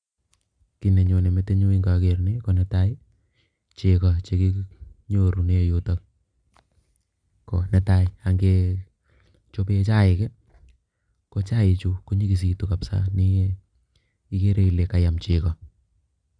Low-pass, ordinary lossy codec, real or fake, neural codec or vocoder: 9.9 kHz; Opus, 64 kbps; real; none